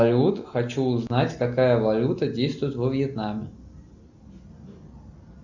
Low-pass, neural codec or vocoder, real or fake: 7.2 kHz; none; real